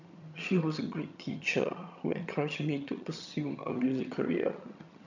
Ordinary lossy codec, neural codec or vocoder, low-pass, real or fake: none; vocoder, 22.05 kHz, 80 mel bands, HiFi-GAN; 7.2 kHz; fake